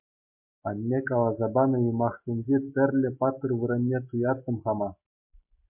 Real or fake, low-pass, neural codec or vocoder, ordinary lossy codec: real; 3.6 kHz; none; MP3, 24 kbps